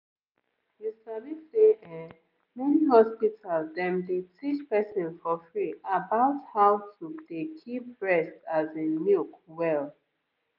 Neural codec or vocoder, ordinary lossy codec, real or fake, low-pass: none; none; real; 5.4 kHz